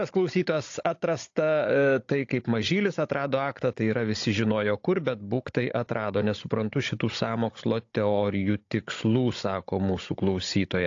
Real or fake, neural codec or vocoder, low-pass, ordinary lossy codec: fake; codec, 16 kHz, 16 kbps, FunCodec, trained on Chinese and English, 50 frames a second; 7.2 kHz; AAC, 48 kbps